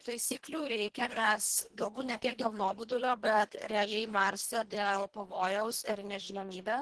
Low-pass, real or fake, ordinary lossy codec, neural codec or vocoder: 10.8 kHz; fake; Opus, 16 kbps; codec, 24 kHz, 1.5 kbps, HILCodec